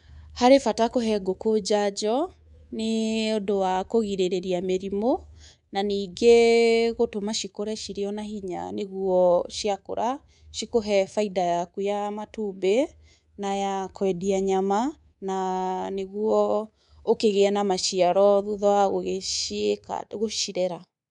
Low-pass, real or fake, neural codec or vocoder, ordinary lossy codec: 10.8 kHz; fake; codec, 24 kHz, 3.1 kbps, DualCodec; none